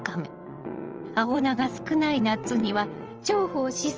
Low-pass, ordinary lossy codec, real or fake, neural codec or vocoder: 7.2 kHz; Opus, 24 kbps; fake; vocoder, 22.05 kHz, 80 mel bands, WaveNeXt